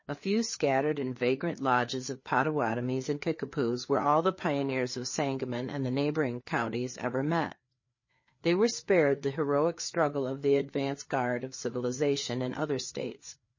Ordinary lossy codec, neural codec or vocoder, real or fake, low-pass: MP3, 32 kbps; codec, 16 kHz, 4 kbps, FreqCodec, larger model; fake; 7.2 kHz